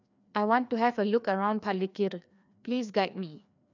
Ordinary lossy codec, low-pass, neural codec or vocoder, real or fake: none; 7.2 kHz; codec, 16 kHz, 2 kbps, FreqCodec, larger model; fake